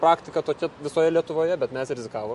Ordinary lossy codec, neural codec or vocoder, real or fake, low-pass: MP3, 48 kbps; none; real; 14.4 kHz